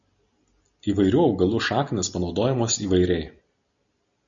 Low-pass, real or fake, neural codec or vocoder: 7.2 kHz; real; none